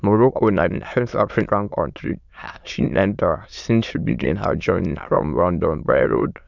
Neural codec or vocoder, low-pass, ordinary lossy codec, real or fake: autoencoder, 22.05 kHz, a latent of 192 numbers a frame, VITS, trained on many speakers; 7.2 kHz; none; fake